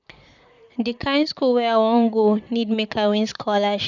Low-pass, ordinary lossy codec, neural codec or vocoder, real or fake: 7.2 kHz; none; vocoder, 44.1 kHz, 128 mel bands, Pupu-Vocoder; fake